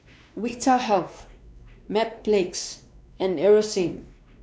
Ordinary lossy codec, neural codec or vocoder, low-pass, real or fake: none; codec, 16 kHz, 2 kbps, X-Codec, WavLM features, trained on Multilingual LibriSpeech; none; fake